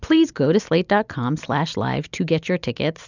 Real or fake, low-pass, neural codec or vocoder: real; 7.2 kHz; none